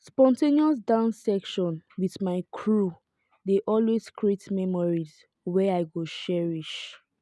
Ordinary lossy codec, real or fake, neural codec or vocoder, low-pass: none; real; none; none